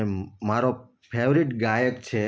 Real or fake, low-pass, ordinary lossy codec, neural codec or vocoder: real; none; none; none